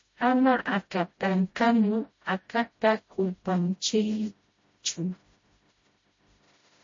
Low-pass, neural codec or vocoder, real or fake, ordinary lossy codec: 7.2 kHz; codec, 16 kHz, 0.5 kbps, FreqCodec, smaller model; fake; MP3, 32 kbps